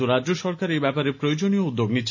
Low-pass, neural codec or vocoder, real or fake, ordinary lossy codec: 7.2 kHz; none; real; none